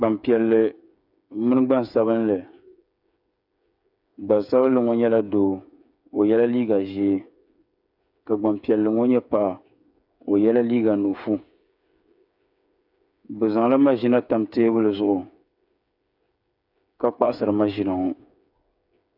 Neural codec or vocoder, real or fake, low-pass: codec, 16 kHz, 8 kbps, FreqCodec, smaller model; fake; 5.4 kHz